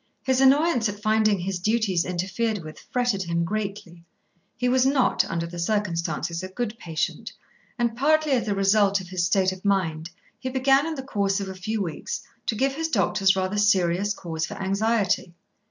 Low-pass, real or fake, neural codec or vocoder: 7.2 kHz; real; none